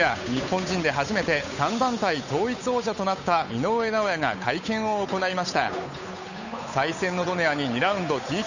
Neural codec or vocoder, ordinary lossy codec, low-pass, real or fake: codec, 16 kHz, 8 kbps, FunCodec, trained on Chinese and English, 25 frames a second; none; 7.2 kHz; fake